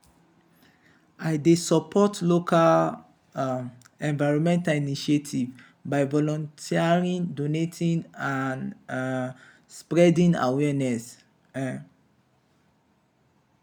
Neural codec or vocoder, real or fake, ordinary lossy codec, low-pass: none; real; none; none